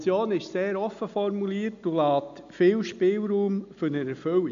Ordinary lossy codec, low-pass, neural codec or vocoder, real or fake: AAC, 64 kbps; 7.2 kHz; none; real